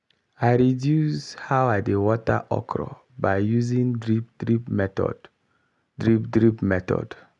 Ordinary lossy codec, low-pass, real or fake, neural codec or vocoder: none; 10.8 kHz; real; none